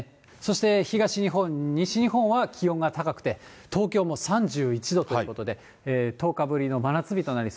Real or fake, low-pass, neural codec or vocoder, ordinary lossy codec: real; none; none; none